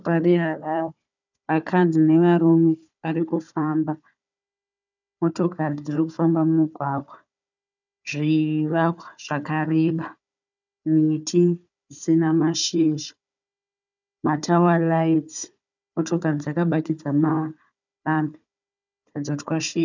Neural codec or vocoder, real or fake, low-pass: codec, 16 kHz, 4 kbps, FunCodec, trained on Chinese and English, 50 frames a second; fake; 7.2 kHz